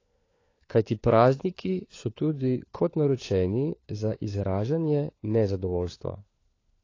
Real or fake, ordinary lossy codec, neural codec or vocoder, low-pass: fake; AAC, 32 kbps; codec, 16 kHz, 4 kbps, FunCodec, trained on LibriTTS, 50 frames a second; 7.2 kHz